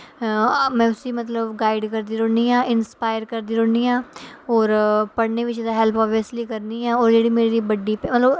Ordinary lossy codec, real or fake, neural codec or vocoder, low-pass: none; real; none; none